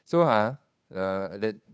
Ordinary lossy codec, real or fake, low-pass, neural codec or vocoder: none; fake; none; codec, 16 kHz, 2 kbps, FunCodec, trained on Chinese and English, 25 frames a second